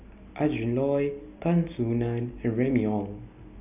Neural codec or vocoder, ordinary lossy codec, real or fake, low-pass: none; none; real; 3.6 kHz